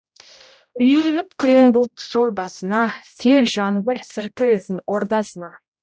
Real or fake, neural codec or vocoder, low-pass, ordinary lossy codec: fake; codec, 16 kHz, 0.5 kbps, X-Codec, HuBERT features, trained on general audio; none; none